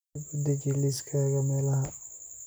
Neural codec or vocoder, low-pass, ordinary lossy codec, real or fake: none; none; none; real